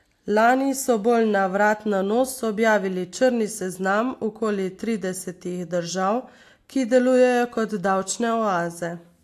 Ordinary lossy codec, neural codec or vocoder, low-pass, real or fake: AAC, 64 kbps; none; 14.4 kHz; real